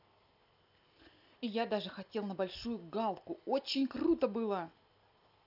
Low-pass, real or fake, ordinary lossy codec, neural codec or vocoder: 5.4 kHz; real; none; none